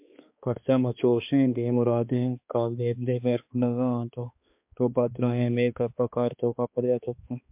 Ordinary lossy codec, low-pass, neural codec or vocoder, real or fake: MP3, 32 kbps; 3.6 kHz; codec, 16 kHz, 2 kbps, X-Codec, HuBERT features, trained on balanced general audio; fake